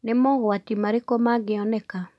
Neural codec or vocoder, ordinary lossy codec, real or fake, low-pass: none; none; real; none